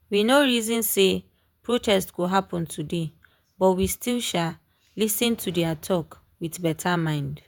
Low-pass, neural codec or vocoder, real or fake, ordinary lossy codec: none; vocoder, 48 kHz, 128 mel bands, Vocos; fake; none